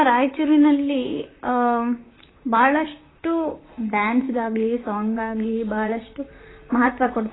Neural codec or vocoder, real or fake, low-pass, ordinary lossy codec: vocoder, 44.1 kHz, 128 mel bands, Pupu-Vocoder; fake; 7.2 kHz; AAC, 16 kbps